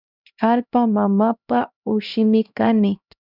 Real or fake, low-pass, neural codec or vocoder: fake; 5.4 kHz; codec, 16 kHz, 1 kbps, X-Codec, HuBERT features, trained on LibriSpeech